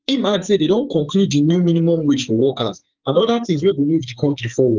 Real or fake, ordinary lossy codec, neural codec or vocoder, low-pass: fake; Opus, 32 kbps; codec, 44.1 kHz, 2.6 kbps, SNAC; 7.2 kHz